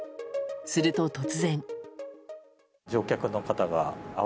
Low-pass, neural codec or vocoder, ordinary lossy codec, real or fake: none; none; none; real